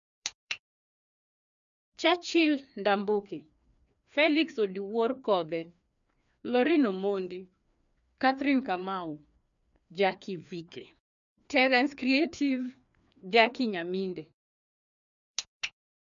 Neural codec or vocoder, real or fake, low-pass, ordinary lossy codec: codec, 16 kHz, 2 kbps, FreqCodec, larger model; fake; 7.2 kHz; none